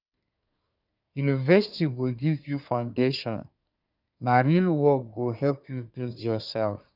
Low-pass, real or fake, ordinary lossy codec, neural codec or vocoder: 5.4 kHz; fake; none; codec, 24 kHz, 1 kbps, SNAC